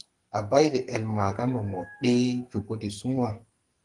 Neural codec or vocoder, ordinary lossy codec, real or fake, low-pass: codec, 44.1 kHz, 2.6 kbps, SNAC; Opus, 24 kbps; fake; 10.8 kHz